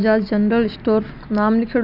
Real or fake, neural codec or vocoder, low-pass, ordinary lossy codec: real; none; 5.4 kHz; none